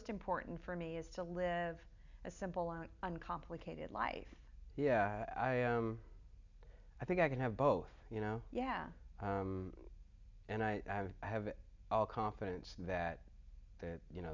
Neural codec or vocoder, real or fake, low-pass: none; real; 7.2 kHz